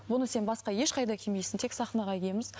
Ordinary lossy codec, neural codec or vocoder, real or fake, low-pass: none; none; real; none